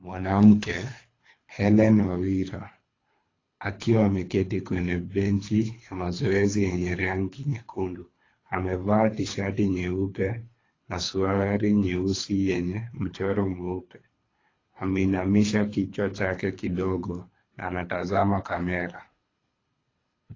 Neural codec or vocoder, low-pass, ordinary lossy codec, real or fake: codec, 24 kHz, 3 kbps, HILCodec; 7.2 kHz; AAC, 32 kbps; fake